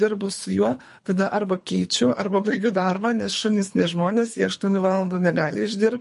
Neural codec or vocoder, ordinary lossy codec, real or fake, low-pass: codec, 24 kHz, 3 kbps, HILCodec; MP3, 48 kbps; fake; 10.8 kHz